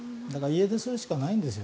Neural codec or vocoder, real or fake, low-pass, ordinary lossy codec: none; real; none; none